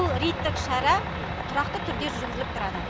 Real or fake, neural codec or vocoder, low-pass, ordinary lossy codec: real; none; none; none